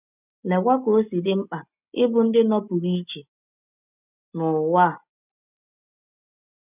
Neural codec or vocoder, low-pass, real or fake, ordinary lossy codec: none; 3.6 kHz; real; none